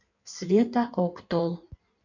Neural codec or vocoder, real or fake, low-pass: codec, 16 kHz in and 24 kHz out, 1.1 kbps, FireRedTTS-2 codec; fake; 7.2 kHz